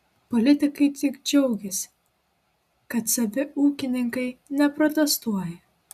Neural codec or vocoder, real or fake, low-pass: none; real; 14.4 kHz